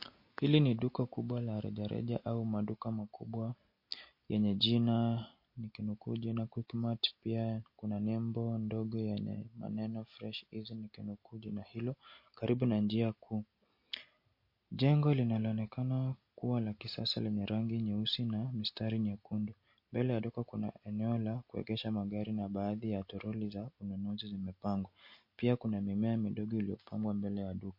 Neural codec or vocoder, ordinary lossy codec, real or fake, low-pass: none; MP3, 32 kbps; real; 5.4 kHz